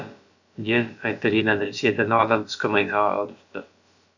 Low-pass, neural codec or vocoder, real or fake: 7.2 kHz; codec, 16 kHz, about 1 kbps, DyCAST, with the encoder's durations; fake